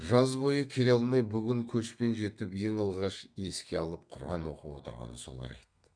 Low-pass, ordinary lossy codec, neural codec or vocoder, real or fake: 9.9 kHz; none; codec, 32 kHz, 1.9 kbps, SNAC; fake